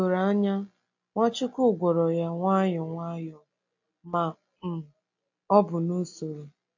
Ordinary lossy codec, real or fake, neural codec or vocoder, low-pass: AAC, 48 kbps; real; none; 7.2 kHz